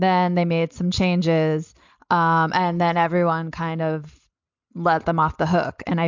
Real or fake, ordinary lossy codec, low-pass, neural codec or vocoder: real; MP3, 64 kbps; 7.2 kHz; none